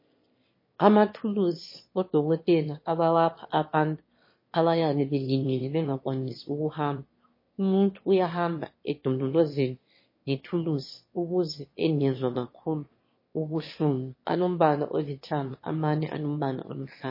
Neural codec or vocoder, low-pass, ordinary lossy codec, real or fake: autoencoder, 22.05 kHz, a latent of 192 numbers a frame, VITS, trained on one speaker; 5.4 kHz; MP3, 24 kbps; fake